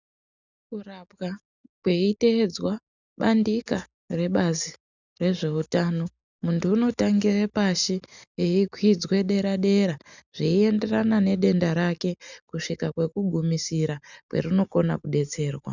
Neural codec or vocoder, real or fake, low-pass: none; real; 7.2 kHz